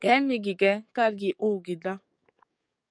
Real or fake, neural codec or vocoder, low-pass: fake; codec, 44.1 kHz, 7.8 kbps, DAC; 9.9 kHz